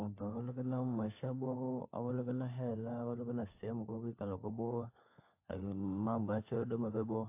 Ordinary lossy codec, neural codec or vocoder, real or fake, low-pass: AAC, 24 kbps; codec, 16 kHz, 4 kbps, FunCodec, trained on LibriTTS, 50 frames a second; fake; 3.6 kHz